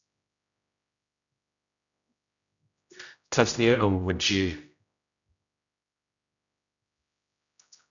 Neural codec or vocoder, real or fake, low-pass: codec, 16 kHz, 0.5 kbps, X-Codec, HuBERT features, trained on general audio; fake; 7.2 kHz